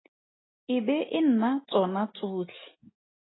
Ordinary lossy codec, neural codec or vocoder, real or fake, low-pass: AAC, 16 kbps; none; real; 7.2 kHz